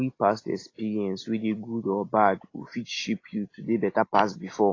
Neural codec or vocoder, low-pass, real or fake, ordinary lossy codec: none; 7.2 kHz; real; AAC, 32 kbps